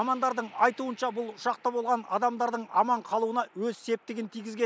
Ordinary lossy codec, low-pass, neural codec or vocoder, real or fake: none; none; none; real